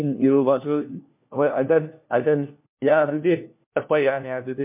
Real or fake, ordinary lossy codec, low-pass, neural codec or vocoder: fake; MP3, 32 kbps; 3.6 kHz; codec, 16 kHz, 1 kbps, FunCodec, trained on LibriTTS, 50 frames a second